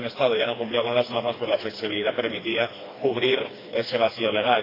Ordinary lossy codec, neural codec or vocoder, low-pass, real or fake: AAC, 24 kbps; codec, 16 kHz, 2 kbps, FreqCodec, smaller model; 5.4 kHz; fake